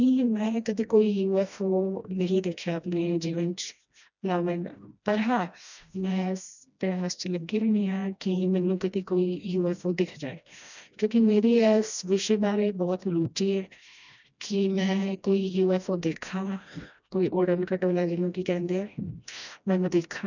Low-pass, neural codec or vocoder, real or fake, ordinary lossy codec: 7.2 kHz; codec, 16 kHz, 1 kbps, FreqCodec, smaller model; fake; none